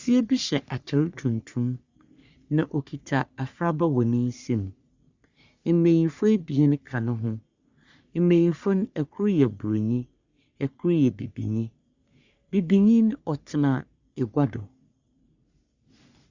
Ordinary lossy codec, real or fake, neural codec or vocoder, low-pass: Opus, 64 kbps; fake; codec, 44.1 kHz, 3.4 kbps, Pupu-Codec; 7.2 kHz